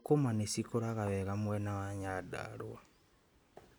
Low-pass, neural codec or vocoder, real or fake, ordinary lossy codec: none; none; real; none